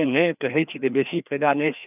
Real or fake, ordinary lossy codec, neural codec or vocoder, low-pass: fake; none; codec, 16 kHz, 2 kbps, FreqCodec, larger model; 3.6 kHz